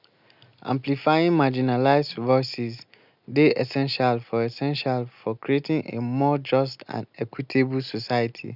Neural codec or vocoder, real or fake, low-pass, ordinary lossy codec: none; real; 5.4 kHz; none